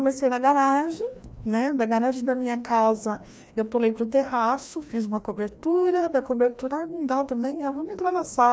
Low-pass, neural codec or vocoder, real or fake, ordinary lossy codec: none; codec, 16 kHz, 1 kbps, FreqCodec, larger model; fake; none